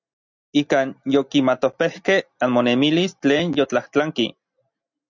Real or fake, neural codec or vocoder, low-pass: real; none; 7.2 kHz